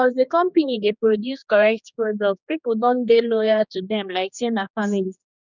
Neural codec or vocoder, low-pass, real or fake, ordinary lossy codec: codec, 16 kHz, 2 kbps, X-Codec, HuBERT features, trained on general audio; 7.2 kHz; fake; none